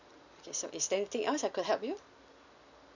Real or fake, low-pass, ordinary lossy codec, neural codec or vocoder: real; 7.2 kHz; none; none